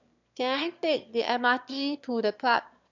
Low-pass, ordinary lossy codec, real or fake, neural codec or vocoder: 7.2 kHz; none; fake; autoencoder, 22.05 kHz, a latent of 192 numbers a frame, VITS, trained on one speaker